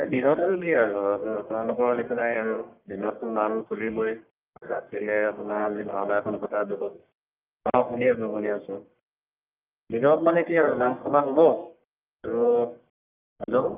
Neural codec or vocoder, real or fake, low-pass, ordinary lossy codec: codec, 44.1 kHz, 1.7 kbps, Pupu-Codec; fake; 3.6 kHz; Opus, 24 kbps